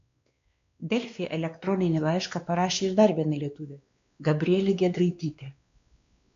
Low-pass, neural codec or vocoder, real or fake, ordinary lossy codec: 7.2 kHz; codec, 16 kHz, 2 kbps, X-Codec, WavLM features, trained on Multilingual LibriSpeech; fake; AAC, 64 kbps